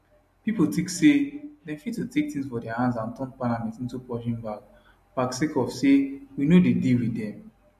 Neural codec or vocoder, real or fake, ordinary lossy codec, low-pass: none; real; MP3, 64 kbps; 14.4 kHz